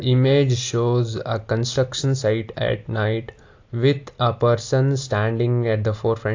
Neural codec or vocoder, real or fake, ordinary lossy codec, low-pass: none; real; AAC, 48 kbps; 7.2 kHz